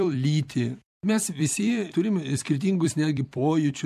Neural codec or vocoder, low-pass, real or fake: vocoder, 44.1 kHz, 128 mel bands every 256 samples, BigVGAN v2; 14.4 kHz; fake